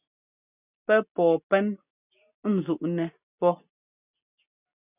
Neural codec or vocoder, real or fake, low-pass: none; real; 3.6 kHz